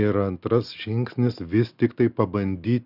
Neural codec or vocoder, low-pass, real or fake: none; 5.4 kHz; real